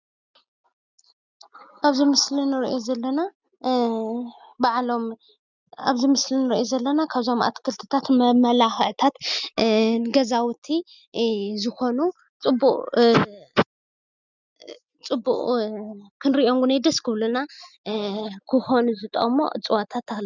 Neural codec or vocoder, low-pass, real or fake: none; 7.2 kHz; real